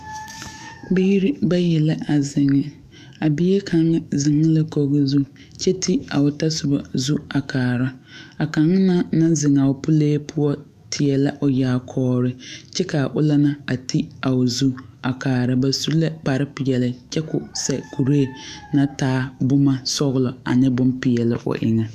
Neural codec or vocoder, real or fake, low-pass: autoencoder, 48 kHz, 128 numbers a frame, DAC-VAE, trained on Japanese speech; fake; 14.4 kHz